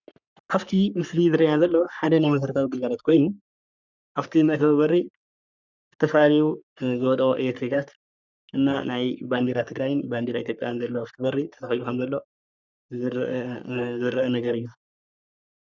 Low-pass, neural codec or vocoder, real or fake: 7.2 kHz; codec, 44.1 kHz, 3.4 kbps, Pupu-Codec; fake